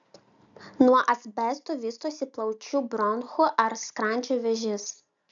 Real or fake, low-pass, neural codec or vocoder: real; 7.2 kHz; none